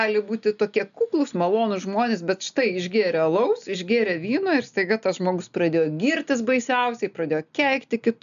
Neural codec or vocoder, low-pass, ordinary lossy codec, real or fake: none; 7.2 kHz; MP3, 96 kbps; real